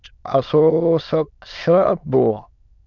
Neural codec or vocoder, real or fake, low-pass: autoencoder, 22.05 kHz, a latent of 192 numbers a frame, VITS, trained on many speakers; fake; 7.2 kHz